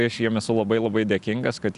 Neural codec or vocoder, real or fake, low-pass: none; real; 10.8 kHz